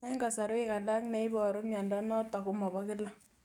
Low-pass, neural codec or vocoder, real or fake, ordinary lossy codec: 19.8 kHz; codec, 44.1 kHz, 7.8 kbps, DAC; fake; none